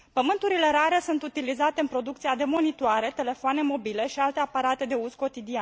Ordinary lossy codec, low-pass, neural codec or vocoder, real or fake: none; none; none; real